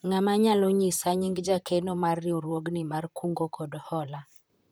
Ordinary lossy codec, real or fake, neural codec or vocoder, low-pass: none; fake; vocoder, 44.1 kHz, 128 mel bands, Pupu-Vocoder; none